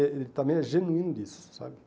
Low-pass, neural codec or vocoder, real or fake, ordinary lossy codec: none; none; real; none